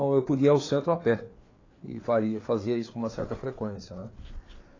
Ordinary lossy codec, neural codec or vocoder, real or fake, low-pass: AAC, 32 kbps; codec, 16 kHz, 4 kbps, FreqCodec, larger model; fake; 7.2 kHz